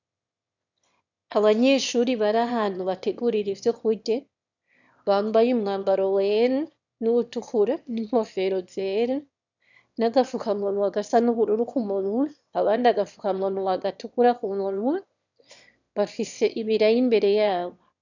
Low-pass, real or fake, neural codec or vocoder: 7.2 kHz; fake; autoencoder, 22.05 kHz, a latent of 192 numbers a frame, VITS, trained on one speaker